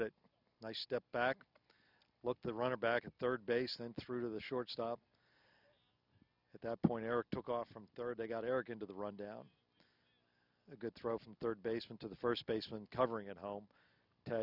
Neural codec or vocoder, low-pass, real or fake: none; 5.4 kHz; real